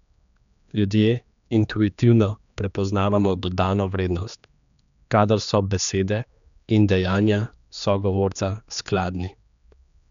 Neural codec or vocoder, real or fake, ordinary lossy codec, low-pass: codec, 16 kHz, 2 kbps, X-Codec, HuBERT features, trained on general audio; fake; none; 7.2 kHz